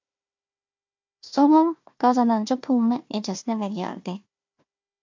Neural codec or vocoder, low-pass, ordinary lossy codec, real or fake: codec, 16 kHz, 1 kbps, FunCodec, trained on Chinese and English, 50 frames a second; 7.2 kHz; MP3, 48 kbps; fake